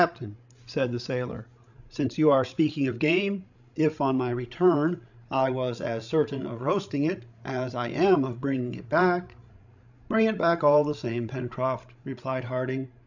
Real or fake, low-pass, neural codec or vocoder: fake; 7.2 kHz; codec, 16 kHz, 16 kbps, FreqCodec, larger model